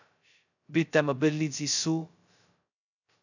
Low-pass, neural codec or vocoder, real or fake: 7.2 kHz; codec, 16 kHz, 0.2 kbps, FocalCodec; fake